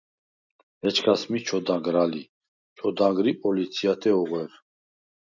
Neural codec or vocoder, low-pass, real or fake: none; 7.2 kHz; real